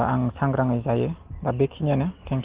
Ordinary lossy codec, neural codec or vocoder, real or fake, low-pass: Opus, 16 kbps; none; real; 3.6 kHz